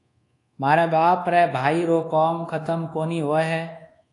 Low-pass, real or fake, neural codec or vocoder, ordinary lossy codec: 10.8 kHz; fake; codec, 24 kHz, 1.2 kbps, DualCodec; AAC, 48 kbps